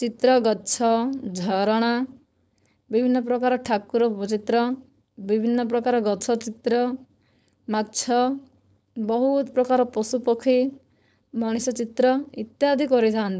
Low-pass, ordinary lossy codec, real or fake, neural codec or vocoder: none; none; fake; codec, 16 kHz, 4.8 kbps, FACodec